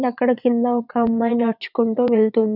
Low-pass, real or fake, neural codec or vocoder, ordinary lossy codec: 5.4 kHz; fake; vocoder, 22.05 kHz, 80 mel bands, WaveNeXt; none